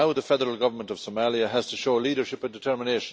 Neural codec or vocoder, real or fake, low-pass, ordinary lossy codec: none; real; none; none